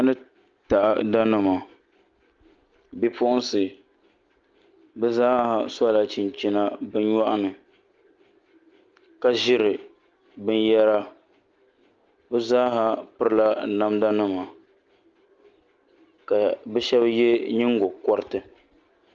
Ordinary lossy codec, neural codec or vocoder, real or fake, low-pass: Opus, 32 kbps; none; real; 7.2 kHz